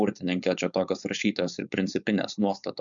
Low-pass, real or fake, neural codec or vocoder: 7.2 kHz; fake; codec, 16 kHz, 4.8 kbps, FACodec